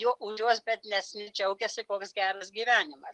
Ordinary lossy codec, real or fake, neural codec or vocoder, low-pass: Opus, 32 kbps; real; none; 10.8 kHz